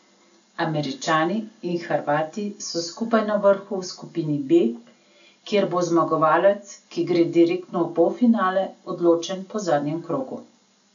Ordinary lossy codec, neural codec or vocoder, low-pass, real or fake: none; none; 7.2 kHz; real